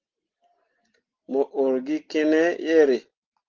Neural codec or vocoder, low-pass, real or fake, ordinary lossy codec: none; 7.2 kHz; real; Opus, 16 kbps